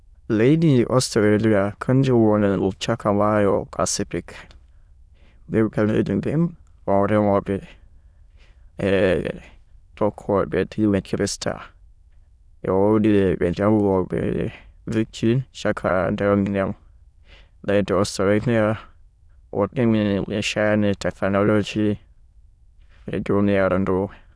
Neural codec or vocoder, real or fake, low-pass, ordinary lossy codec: autoencoder, 22.05 kHz, a latent of 192 numbers a frame, VITS, trained on many speakers; fake; none; none